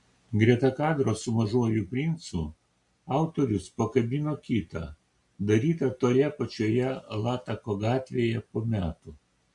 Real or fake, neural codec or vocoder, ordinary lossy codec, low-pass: real; none; MP3, 64 kbps; 10.8 kHz